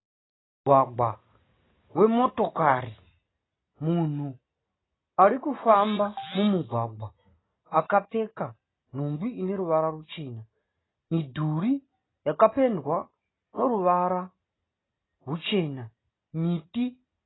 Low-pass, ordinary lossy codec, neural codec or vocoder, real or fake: 7.2 kHz; AAC, 16 kbps; none; real